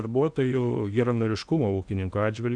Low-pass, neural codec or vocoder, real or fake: 9.9 kHz; codec, 16 kHz in and 24 kHz out, 0.8 kbps, FocalCodec, streaming, 65536 codes; fake